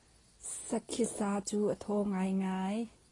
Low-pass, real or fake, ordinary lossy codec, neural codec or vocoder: 10.8 kHz; real; AAC, 32 kbps; none